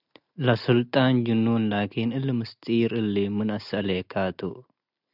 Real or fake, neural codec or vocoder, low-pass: real; none; 5.4 kHz